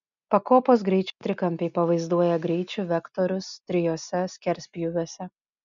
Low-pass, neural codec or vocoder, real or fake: 7.2 kHz; none; real